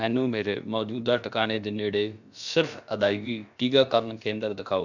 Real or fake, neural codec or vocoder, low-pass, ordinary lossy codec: fake; codec, 16 kHz, about 1 kbps, DyCAST, with the encoder's durations; 7.2 kHz; none